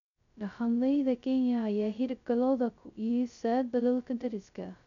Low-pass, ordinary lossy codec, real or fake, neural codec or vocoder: 7.2 kHz; none; fake; codec, 16 kHz, 0.2 kbps, FocalCodec